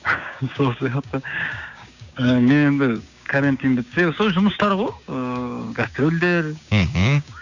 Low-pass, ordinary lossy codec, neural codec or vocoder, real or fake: 7.2 kHz; none; codec, 16 kHz, 6 kbps, DAC; fake